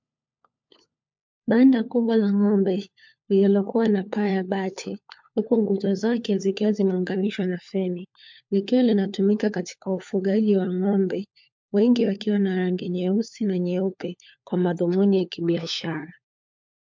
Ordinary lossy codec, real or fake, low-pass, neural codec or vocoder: MP3, 48 kbps; fake; 7.2 kHz; codec, 16 kHz, 4 kbps, FunCodec, trained on LibriTTS, 50 frames a second